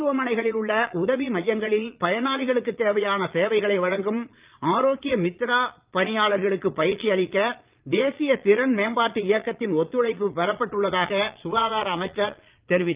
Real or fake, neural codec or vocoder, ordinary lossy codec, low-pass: fake; vocoder, 22.05 kHz, 80 mel bands, Vocos; Opus, 24 kbps; 3.6 kHz